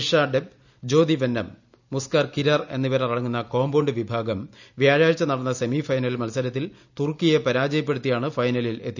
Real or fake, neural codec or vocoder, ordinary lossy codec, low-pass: real; none; none; 7.2 kHz